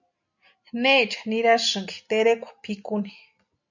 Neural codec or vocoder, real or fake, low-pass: none; real; 7.2 kHz